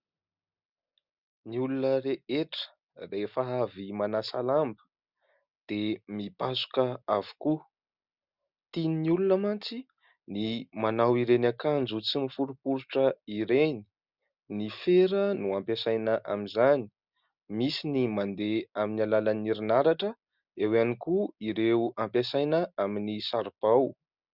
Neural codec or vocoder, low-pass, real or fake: none; 5.4 kHz; real